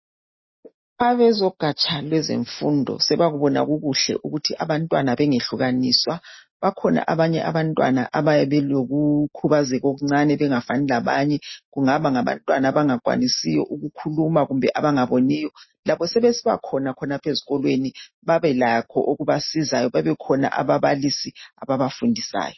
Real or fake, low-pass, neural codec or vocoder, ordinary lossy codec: real; 7.2 kHz; none; MP3, 24 kbps